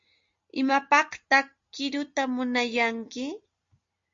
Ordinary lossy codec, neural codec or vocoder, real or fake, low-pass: MP3, 48 kbps; none; real; 7.2 kHz